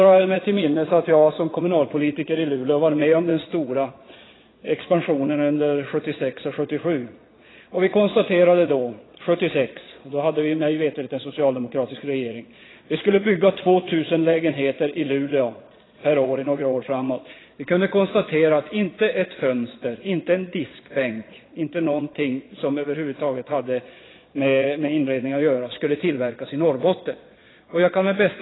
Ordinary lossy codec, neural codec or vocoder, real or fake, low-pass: AAC, 16 kbps; vocoder, 22.05 kHz, 80 mel bands, Vocos; fake; 7.2 kHz